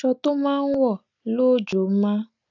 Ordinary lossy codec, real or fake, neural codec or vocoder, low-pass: none; real; none; 7.2 kHz